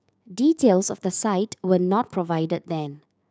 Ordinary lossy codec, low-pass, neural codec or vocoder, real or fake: none; none; codec, 16 kHz, 6 kbps, DAC; fake